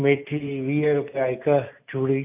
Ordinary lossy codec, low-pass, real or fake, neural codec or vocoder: AAC, 32 kbps; 3.6 kHz; real; none